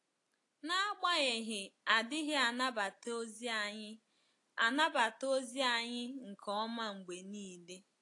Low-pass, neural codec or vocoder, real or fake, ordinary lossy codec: 10.8 kHz; none; real; AAC, 48 kbps